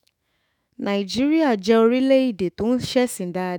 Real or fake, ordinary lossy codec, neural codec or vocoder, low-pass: fake; none; autoencoder, 48 kHz, 128 numbers a frame, DAC-VAE, trained on Japanese speech; 19.8 kHz